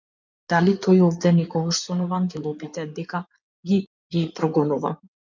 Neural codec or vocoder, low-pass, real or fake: codec, 16 kHz in and 24 kHz out, 2.2 kbps, FireRedTTS-2 codec; 7.2 kHz; fake